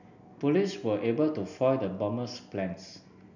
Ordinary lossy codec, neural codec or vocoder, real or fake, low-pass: none; none; real; 7.2 kHz